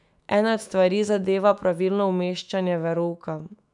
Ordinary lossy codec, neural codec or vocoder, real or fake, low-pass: none; autoencoder, 48 kHz, 128 numbers a frame, DAC-VAE, trained on Japanese speech; fake; 10.8 kHz